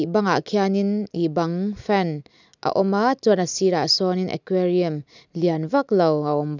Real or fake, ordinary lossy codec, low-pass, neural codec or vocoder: real; none; 7.2 kHz; none